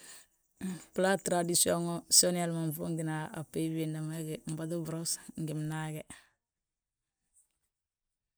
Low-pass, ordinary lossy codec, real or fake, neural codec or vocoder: none; none; real; none